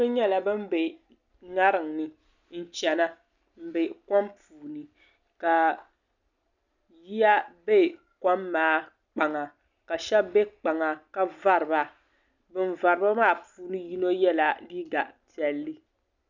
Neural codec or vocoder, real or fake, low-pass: none; real; 7.2 kHz